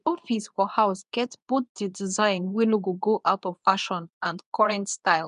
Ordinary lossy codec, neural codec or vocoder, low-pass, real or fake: none; codec, 24 kHz, 0.9 kbps, WavTokenizer, medium speech release version 2; 10.8 kHz; fake